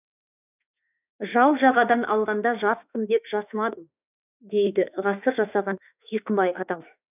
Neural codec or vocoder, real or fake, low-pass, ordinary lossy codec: autoencoder, 48 kHz, 32 numbers a frame, DAC-VAE, trained on Japanese speech; fake; 3.6 kHz; none